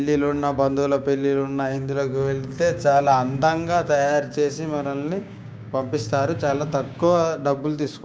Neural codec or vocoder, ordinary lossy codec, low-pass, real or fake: codec, 16 kHz, 6 kbps, DAC; none; none; fake